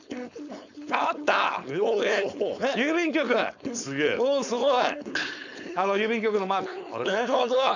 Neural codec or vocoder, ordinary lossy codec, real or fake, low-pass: codec, 16 kHz, 4.8 kbps, FACodec; none; fake; 7.2 kHz